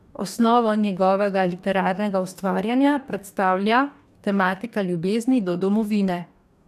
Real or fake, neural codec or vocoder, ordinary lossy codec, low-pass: fake; codec, 44.1 kHz, 2.6 kbps, DAC; none; 14.4 kHz